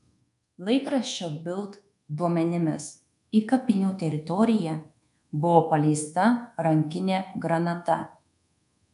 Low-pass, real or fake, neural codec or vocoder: 10.8 kHz; fake; codec, 24 kHz, 1.2 kbps, DualCodec